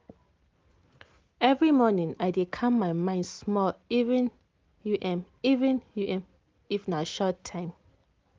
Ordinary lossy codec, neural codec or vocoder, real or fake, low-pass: Opus, 32 kbps; none; real; 7.2 kHz